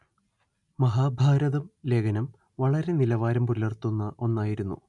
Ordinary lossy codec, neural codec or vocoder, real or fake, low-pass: none; none; real; 10.8 kHz